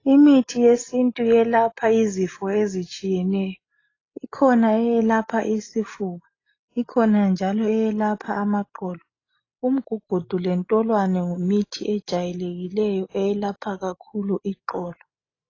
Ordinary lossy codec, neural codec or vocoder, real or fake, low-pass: AAC, 32 kbps; none; real; 7.2 kHz